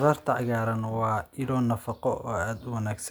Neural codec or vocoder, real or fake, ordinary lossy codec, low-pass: none; real; none; none